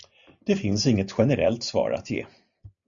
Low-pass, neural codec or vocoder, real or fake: 7.2 kHz; none; real